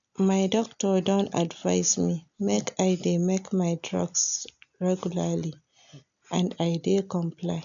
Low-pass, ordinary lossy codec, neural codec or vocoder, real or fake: 7.2 kHz; none; none; real